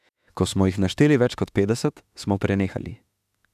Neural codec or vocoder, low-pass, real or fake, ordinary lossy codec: autoencoder, 48 kHz, 32 numbers a frame, DAC-VAE, trained on Japanese speech; 14.4 kHz; fake; none